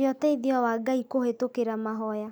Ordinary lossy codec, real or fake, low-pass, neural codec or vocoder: none; real; none; none